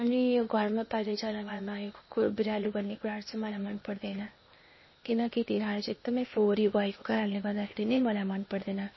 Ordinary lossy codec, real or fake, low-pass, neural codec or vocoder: MP3, 24 kbps; fake; 7.2 kHz; codec, 16 kHz, 0.8 kbps, ZipCodec